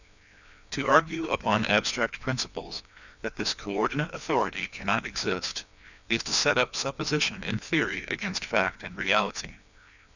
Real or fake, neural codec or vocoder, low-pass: fake; codec, 16 kHz, 2 kbps, FreqCodec, larger model; 7.2 kHz